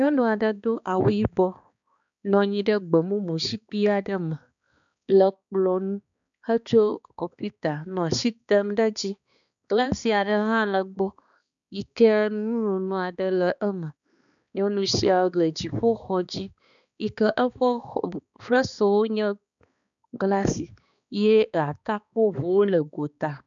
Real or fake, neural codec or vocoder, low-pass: fake; codec, 16 kHz, 2 kbps, X-Codec, HuBERT features, trained on balanced general audio; 7.2 kHz